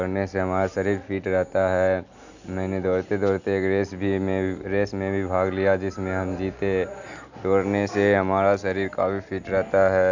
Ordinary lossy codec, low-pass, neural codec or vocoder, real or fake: none; 7.2 kHz; none; real